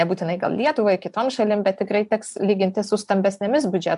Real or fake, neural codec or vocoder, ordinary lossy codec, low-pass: real; none; AAC, 96 kbps; 10.8 kHz